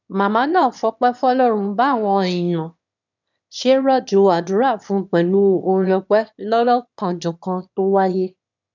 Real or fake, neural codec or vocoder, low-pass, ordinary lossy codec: fake; autoencoder, 22.05 kHz, a latent of 192 numbers a frame, VITS, trained on one speaker; 7.2 kHz; none